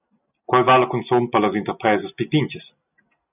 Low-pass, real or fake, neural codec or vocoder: 3.6 kHz; real; none